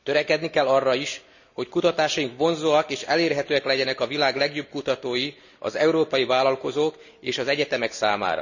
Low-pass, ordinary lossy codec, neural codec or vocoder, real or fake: 7.2 kHz; none; none; real